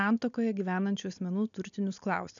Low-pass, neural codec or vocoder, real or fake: 7.2 kHz; none; real